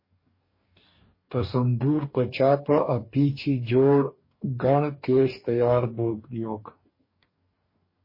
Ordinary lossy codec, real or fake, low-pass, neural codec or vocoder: MP3, 24 kbps; fake; 5.4 kHz; codec, 44.1 kHz, 2.6 kbps, DAC